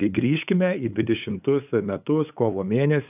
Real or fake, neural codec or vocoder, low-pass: fake; codec, 16 kHz, 8 kbps, FunCodec, trained on LibriTTS, 25 frames a second; 3.6 kHz